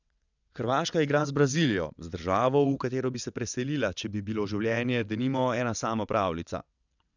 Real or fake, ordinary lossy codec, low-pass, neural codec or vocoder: fake; none; 7.2 kHz; vocoder, 22.05 kHz, 80 mel bands, WaveNeXt